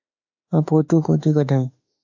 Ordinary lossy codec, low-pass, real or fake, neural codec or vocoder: MP3, 48 kbps; 7.2 kHz; fake; autoencoder, 48 kHz, 32 numbers a frame, DAC-VAE, trained on Japanese speech